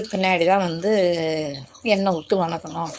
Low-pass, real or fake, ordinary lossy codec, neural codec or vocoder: none; fake; none; codec, 16 kHz, 4.8 kbps, FACodec